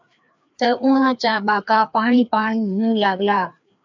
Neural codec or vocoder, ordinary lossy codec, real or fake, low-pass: codec, 16 kHz, 2 kbps, FreqCodec, larger model; MP3, 64 kbps; fake; 7.2 kHz